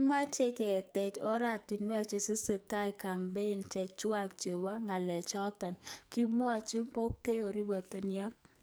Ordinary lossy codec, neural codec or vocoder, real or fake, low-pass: none; codec, 44.1 kHz, 2.6 kbps, SNAC; fake; none